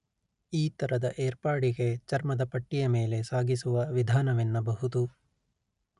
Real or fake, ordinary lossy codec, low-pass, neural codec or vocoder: real; none; 10.8 kHz; none